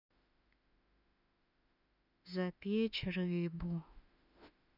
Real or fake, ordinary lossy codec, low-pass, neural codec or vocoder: fake; none; 5.4 kHz; autoencoder, 48 kHz, 32 numbers a frame, DAC-VAE, trained on Japanese speech